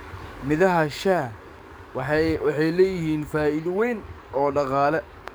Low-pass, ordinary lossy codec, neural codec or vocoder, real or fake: none; none; codec, 44.1 kHz, 7.8 kbps, DAC; fake